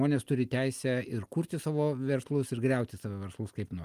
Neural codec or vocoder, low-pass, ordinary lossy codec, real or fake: none; 14.4 kHz; Opus, 32 kbps; real